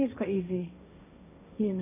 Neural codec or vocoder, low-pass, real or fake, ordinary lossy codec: codec, 16 kHz, 1.1 kbps, Voila-Tokenizer; 3.6 kHz; fake; none